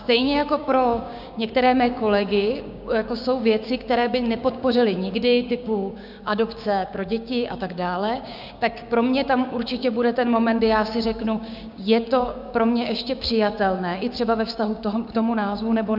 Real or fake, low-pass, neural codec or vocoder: fake; 5.4 kHz; codec, 16 kHz, 6 kbps, DAC